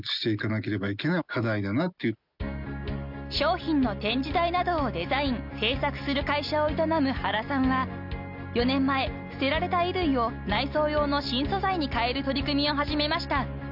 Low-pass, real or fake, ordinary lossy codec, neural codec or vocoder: 5.4 kHz; real; none; none